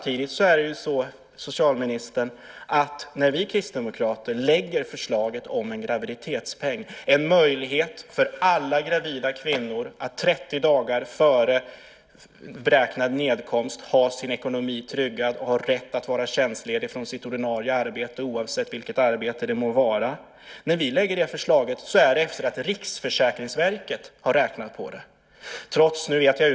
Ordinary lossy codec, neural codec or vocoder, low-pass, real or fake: none; none; none; real